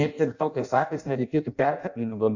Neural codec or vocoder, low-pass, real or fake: codec, 16 kHz in and 24 kHz out, 0.6 kbps, FireRedTTS-2 codec; 7.2 kHz; fake